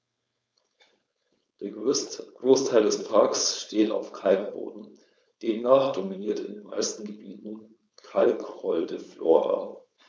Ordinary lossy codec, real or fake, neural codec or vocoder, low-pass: none; fake; codec, 16 kHz, 4.8 kbps, FACodec; none